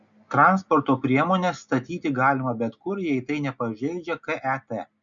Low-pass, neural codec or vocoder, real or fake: 7.2 kHz; none; real